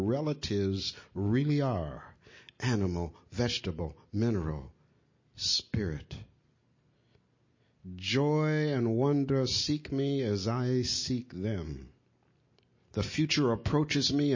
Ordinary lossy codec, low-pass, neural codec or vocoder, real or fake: MP3, 32 kbps; 7.2 kHz; none; real